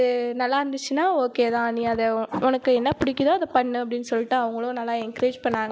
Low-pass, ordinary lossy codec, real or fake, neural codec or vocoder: none; none; real; none